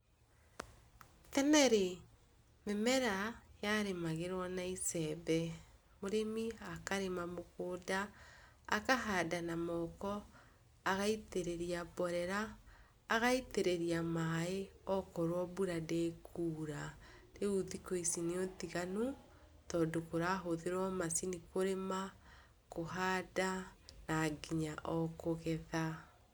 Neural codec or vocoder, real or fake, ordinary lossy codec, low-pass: none; real; none; none